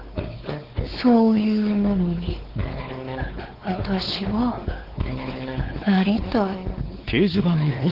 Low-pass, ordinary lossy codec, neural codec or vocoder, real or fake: 5.4 kHz; Opus, 16 kbps; codec, 16 kHz, 4 kbps, X-Codec, WavLM features, trained on Multilingual LibriSpeech; fake